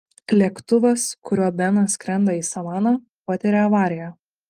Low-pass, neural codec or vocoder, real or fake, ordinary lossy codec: 14.4 kHz; none; real; Opus, 24 kbps